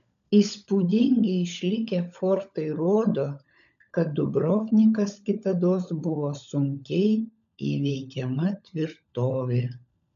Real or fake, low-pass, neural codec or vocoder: fake; 7.2 kHz; codec, 16 kHz, 16 kbps, FunCodec, trained on LibriTTS, 50 frames a second